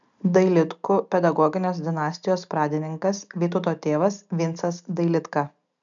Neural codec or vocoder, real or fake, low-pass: none; real; 7.2 kHz